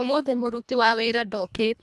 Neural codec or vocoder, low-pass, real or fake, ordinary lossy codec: codec, 24 kHz, 1.5 kbps, HILCodec; none; fake; none